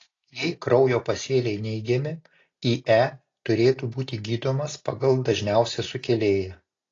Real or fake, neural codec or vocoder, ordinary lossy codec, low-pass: real; none; AAC, 32 kbps; 7.2 kHz